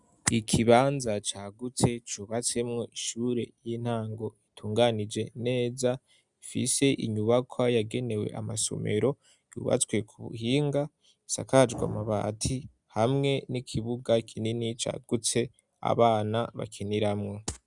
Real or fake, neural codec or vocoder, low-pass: real; none; 10.8 kHz